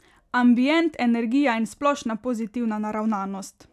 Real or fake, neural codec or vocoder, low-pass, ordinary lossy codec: real; none; 14.4 kHz; none